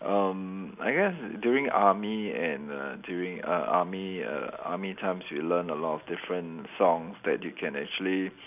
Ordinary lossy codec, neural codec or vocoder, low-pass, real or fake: none; none; 3.6 kHz; real